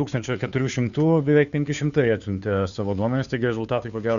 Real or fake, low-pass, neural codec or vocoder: fake; 7.2 kHz; codec, 16 kHz, 2 kbps, FunCodec, trained on Chinese and English, 25 frames a second